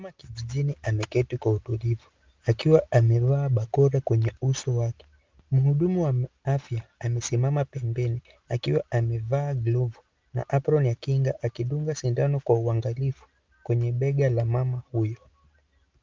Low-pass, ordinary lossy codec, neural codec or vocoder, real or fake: 7.2 kHz; Opus, 24 kbps; none; real